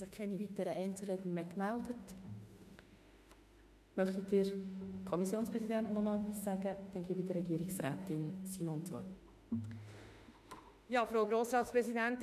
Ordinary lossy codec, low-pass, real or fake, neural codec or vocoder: MP3, 96 kbps; 14.4 kHz; fake; autoencoder, 48 kHz, 32 numbers a frame, DAC-VAE, trained on Japanese speech